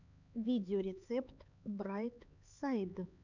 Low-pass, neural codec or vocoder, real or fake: 7.2 kHz; codec, 16 kHz, 4 kbps, X-Codec, HuBERT features, trained on LibriSpeech; fake